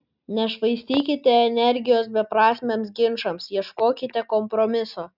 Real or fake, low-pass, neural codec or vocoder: real; 5.4 kHz; none